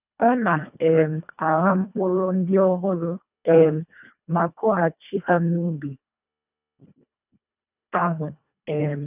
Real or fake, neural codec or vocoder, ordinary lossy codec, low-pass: fake; codec, 24 kHz, 1.5 kbps, HILCodec; none; 3.6 kHz